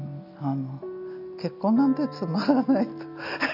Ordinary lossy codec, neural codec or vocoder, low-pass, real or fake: none; none; 5.4 kHz; real